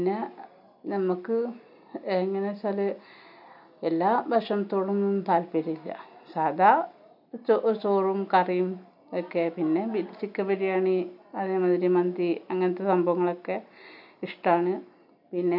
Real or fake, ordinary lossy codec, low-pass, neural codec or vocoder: real; none; 5.4 kHz; none